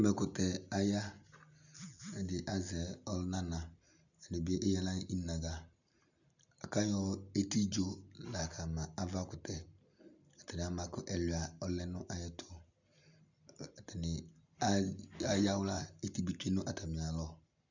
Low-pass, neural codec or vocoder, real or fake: 7.2 kHz; none; real